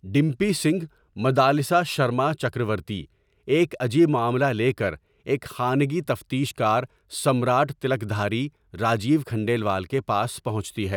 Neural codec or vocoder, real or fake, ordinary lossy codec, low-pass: none; real; none; 19.8 kHz